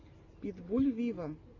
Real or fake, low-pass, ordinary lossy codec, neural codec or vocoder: real; 7.2 kHz; AAC, 48 kbps; none